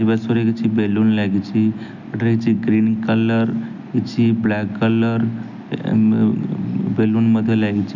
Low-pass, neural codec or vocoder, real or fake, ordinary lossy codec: 7.2 kHz; none; real; none